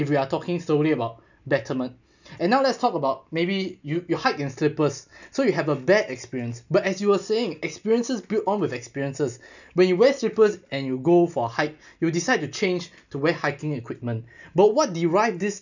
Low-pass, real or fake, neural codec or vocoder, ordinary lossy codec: 7.2 kHz; real; none; none